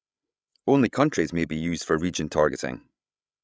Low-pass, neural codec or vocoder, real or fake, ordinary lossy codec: none; codec, 16 kHz, 8 kbps, FreqCodec, larger model; fake; none